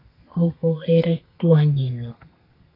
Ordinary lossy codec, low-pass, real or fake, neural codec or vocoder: AAC, 32 kbps; 5.4 kHz; fake; codec, 32 kHz, 1.9 kbps, SNAC